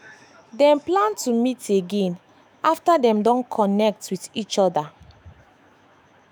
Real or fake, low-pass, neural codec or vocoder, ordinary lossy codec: fake; none; autoencoder, 48 kHz, 128 numbers a frame, DAC-VAE, trained on Japanese speech; none